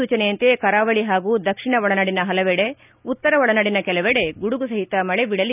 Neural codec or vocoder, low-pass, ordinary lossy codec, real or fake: none; 3.6 kHz; none; real